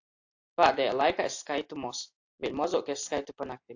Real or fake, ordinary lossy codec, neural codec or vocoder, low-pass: real; AAC, 48 kbps; none; 7.2 kHz